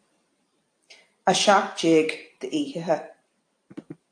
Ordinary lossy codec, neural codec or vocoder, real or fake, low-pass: AAC, 48 kbps; vocoder, 44.1 kHz, 128 mel bands every 512 samples, BigVGAN v2; fake; 9.9 kHz